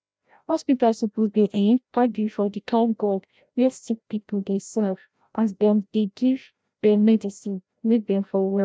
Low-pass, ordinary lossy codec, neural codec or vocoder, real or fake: none; none; codec, 16 kHz, 0.5 kbps, FreqCodec, larger model; fake